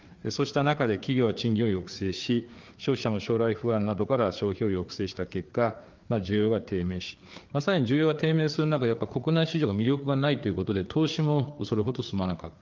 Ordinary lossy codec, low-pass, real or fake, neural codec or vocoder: Opus, 32 kbps; 7.2 kHz; fake; codec, 16 kHz, 4 kbps, FreqCodec, larger model